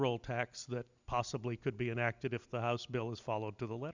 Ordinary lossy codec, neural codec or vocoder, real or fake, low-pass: Opus, 64 kbps; none; real; 7.2 kHz